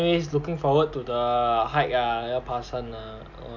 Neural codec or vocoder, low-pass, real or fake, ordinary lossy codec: none; 7.2 kHz; real; none